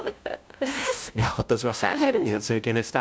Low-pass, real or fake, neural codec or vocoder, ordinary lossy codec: none; fake; codec, 16 kHz, 0.5 kbps, FunCodec, trained on LibriTTS, 25 frames a second; none